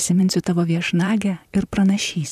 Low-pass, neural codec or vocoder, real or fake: 14.4 kHz; vocoder, 44.1 kHz, 128 mel bands, Pupu-Vocoder; fake